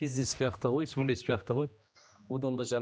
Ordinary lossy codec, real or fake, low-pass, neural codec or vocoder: none; fake; none; codec, 16 kHz, 1 kbps, X-Codec, HuBERT features, trained on general audio